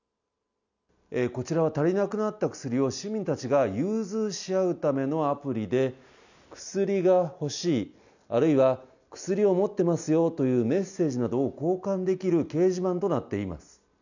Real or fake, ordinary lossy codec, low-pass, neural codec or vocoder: real; none; 7.2 kHz; none